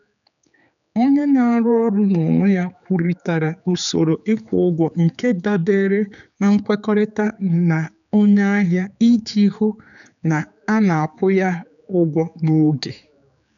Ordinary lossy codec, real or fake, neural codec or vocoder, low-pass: none; fake; codec, 16 kHz, 4 kbps, X-Codec, HuBERT features, trained on general audio; 7.2 kHz